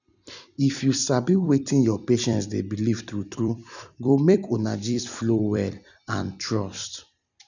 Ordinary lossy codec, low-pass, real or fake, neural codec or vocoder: none; 7.2 kHz; fake; vocoder, 44.1 kHz, 128 mel bands every 256 samples, BigVGAN v2